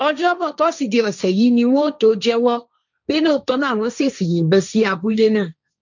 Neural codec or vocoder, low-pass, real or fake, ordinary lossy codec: codec, 16 kHz, 1.1 kbps, Voila-Tokenizer; 7.2 kHz; fake; none